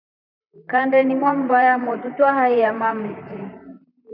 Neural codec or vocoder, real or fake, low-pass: vocoder, 44.1 kHz, 128 mel bands, Pupu-Vocoder; fake; 5.4 kHz